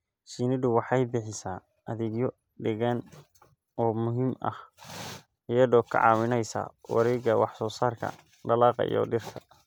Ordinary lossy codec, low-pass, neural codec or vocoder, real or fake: none; none; none; real